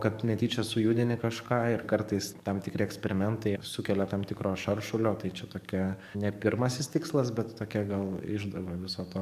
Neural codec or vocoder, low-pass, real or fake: codec, 44.1 kHz, 7.8 kbps, DAC; 14.4 kHz; fake